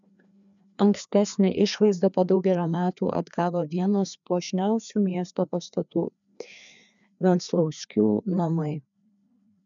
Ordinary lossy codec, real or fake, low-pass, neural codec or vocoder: MP3, 96 kbps; fake; 7.2 kHz; codec, 16 kHz, 2 kbps, FreqCodec, larger model